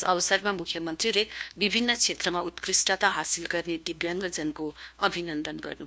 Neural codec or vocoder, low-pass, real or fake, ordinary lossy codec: codec, 16 kHz, 1 kbps, FunCodec, trained on LibriTTS, 50 frames a second; none; fake; none